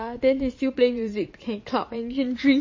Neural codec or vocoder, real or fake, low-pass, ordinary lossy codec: vocoder, 22.05 kHz, 80 mel bands, Vocos; fake; 7.2 kHz; MP3, 32 kbps